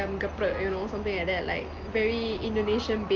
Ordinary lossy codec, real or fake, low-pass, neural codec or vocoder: Opus, 24 kbps; real; 7.2 kHz; none